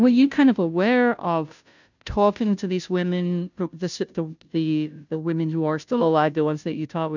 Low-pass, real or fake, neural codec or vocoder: 7.2 kHz; fake; codec, 16 kHz, 0.5 kbps, FunCodec, trained on Chinese and English, 25 frames a second